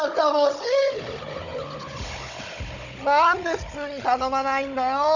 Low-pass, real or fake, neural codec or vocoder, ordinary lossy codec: 7.2 kHz; fake; codec, 16 kHz, 16 kbps, FunCodec, trained on Chinese and English, 50 frames a second; none